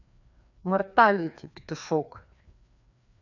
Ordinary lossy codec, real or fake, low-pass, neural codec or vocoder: none; fake; 7.2 kHz; codec, 16 kHz, 2 kbps, FreqCodec, larger model